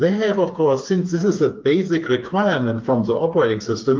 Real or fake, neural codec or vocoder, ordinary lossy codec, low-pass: fake; codec, 16 kHz, 4 kbps, FreqCodec, smaller model; Opus, 32 kbps; 7.2 kHz